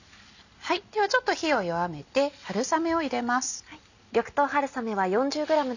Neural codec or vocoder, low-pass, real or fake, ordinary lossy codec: none; 7.2 kHz; real; none